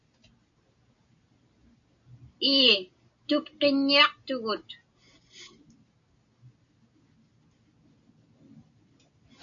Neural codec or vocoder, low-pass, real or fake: none; 7.2 kHz; real